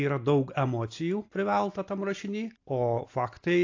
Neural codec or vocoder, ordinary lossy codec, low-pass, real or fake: none; AAC, 48 kbps; 7.2 kHz; real